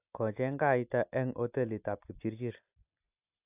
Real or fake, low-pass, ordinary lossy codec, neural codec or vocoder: real; 3.6 kHz; AAC, 32 kbps; none